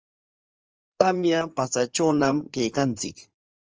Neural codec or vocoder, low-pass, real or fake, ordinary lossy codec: codec, 16 kHz in and 24 kHz out, 2.2 kbps, FireRedTTS-2 codec; 7.2 kHz; fake; Opus, 24 kbps